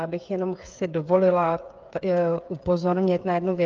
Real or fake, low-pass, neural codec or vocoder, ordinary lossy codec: fake; 7.2 kHz; codec, 16 kHz, 8 kbps, FreqCodec, smaller model; Opus, 24 kbps